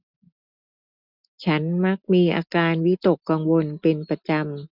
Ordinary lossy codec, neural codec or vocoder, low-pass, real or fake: none; none; 5.4 kHz; real